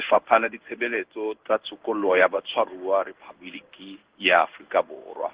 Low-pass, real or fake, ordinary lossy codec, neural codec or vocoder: 3.6 kHz; fake; Opus, 16 kbps; codec, 16 kHz in and 24 kHz out, 1 kbps, XY-Tokenizer